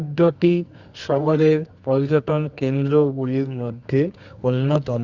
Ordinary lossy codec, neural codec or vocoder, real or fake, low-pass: Opus, 64 kbps; codec, 24 kHz, 0.9 kbps, WavTokenizer, medium music audio release; fake; 7.2 kHz